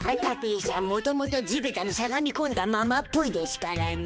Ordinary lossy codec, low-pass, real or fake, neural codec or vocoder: none; none; fake; codec, 16 kHz, 2 kbps, X-Codec, HuBERT features, trained on balanced general audio